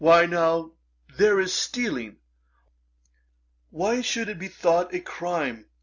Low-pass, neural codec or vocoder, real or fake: 7.2 kHz; none; real